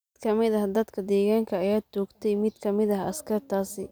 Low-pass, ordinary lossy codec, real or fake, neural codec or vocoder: none; none; real; none